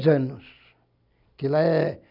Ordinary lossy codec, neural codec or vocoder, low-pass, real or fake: none; none; 5.4 kHz; real